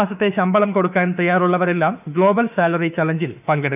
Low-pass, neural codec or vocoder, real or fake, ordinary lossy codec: 3.6 kHz; autoencoder, 48 kHz, 32 numbers a frame, DAC-VAE, trained on Japanese speech; fake; none